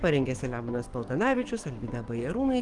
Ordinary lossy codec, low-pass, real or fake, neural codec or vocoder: Opus, 24 kbps; 10.8 kHz; fake; codec, 44.1 kHz, 7.8 kbps, DAC